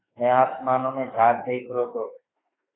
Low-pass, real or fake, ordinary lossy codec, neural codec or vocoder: 7.2 kHz; fake; AAC, 16 kbps; autoencoder, 48 kHz, 32 numbers a frame, DAC-VAE, trained on Japanese speech